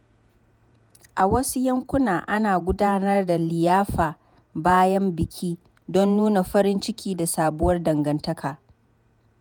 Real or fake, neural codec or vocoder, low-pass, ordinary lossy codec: fake; vocoder, 48 kHz, 128 mel bands, Vocos; none; none